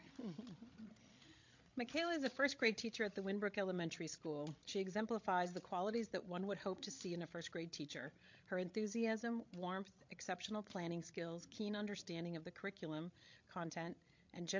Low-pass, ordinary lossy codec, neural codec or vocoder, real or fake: 7.2 kHz; MP3, 48 kbps; codec, 16 kHz, 16 kbps, FreqCodec, larger model; fake